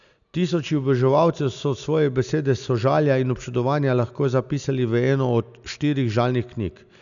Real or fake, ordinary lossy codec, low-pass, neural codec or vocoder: real; none; 7.2 kHz; none